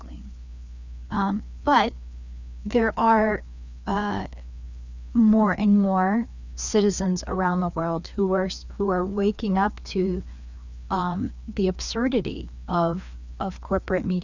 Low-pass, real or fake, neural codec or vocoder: 7.2 kHz; fake; codec, 16 kHz, 2 kbps, FreqCodec, larger model